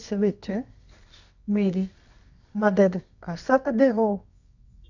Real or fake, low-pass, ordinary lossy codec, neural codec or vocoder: fake; 7.2 kHz; none; codec, 24 kHz, 0.9 kbps, WavTokenizer, medium music audio release